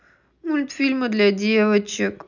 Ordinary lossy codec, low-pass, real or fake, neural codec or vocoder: none; 7.2 kHz; real; none